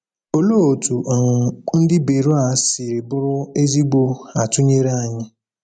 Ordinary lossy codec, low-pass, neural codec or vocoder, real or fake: none; 9.9 kHz; none; real